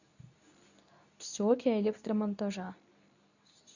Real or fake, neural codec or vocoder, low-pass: fake; codec, 24 kHz, 0.9 kbps, WavTokenizer, medium speech release version 2; 7.2 kHz